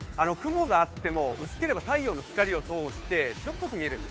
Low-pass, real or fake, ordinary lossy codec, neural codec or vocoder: none; fake; none; codec, 16 kHz, 2 kbps, FunCodec, trained on Chinese and English, 25 frames a second